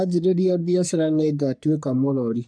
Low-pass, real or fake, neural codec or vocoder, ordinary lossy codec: 9.9 kHz; fake; codec, 44.1 kHz, 3.4 kbps, Pupu-Codec; none